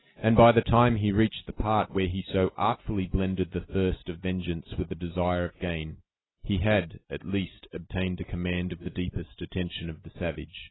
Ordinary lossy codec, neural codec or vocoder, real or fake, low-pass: AAC, 16 kbps; none; real; 7.2 kHz